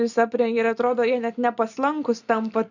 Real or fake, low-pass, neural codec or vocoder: real; 7.2 kHz; none